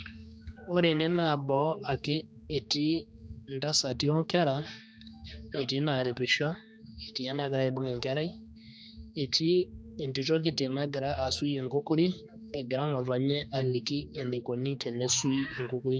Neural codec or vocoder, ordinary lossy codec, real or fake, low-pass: codec, 16 kHz, 2 kbps, X-Codec, HuBERT features, trained on general audio; none; fake; none